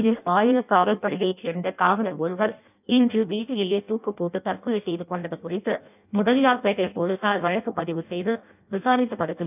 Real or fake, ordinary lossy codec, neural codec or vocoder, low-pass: fake; none; codec, 16 kHz in and 24 kHz out, 0.6 kbps, FireRedTTS-2 codec; 3.6 kHz